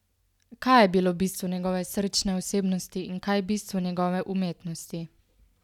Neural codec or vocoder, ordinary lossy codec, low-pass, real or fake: none; none; 19.8 kHz; real